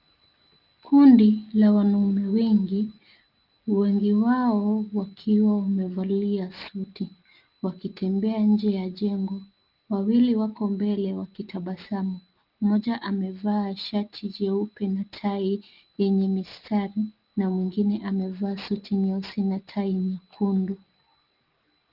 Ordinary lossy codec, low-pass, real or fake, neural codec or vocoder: Opus, 32 kbps; 5.4 kHz; real; none